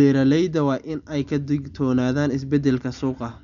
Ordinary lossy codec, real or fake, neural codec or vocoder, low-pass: none; real; none; 7.2 kHz